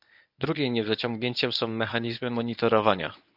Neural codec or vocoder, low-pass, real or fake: codec, 24 kHz, 0.9 kbps, WavTokenizer, medium speech release version 1; 5.4 kHz; fake